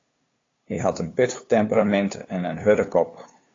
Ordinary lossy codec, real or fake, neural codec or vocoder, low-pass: AAC, 32 kbps; fake; codec, 16 kHz, 2 kbps, FunCodec, trained on LibriTTS, 25 frames a second; 7.2 kHz